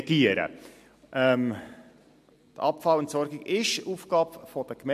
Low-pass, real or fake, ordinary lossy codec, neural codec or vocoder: 14.4 kHz; real; MP3, 64 kbps; none